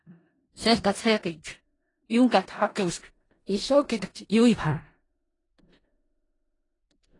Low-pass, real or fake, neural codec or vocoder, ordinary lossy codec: 10.8 kHz; fake; codec, 16 kHz in and 24 kHz out, 0.4 kbps, LongCat-Audio-Codec, four codebook decoder; AAC, 32 kbps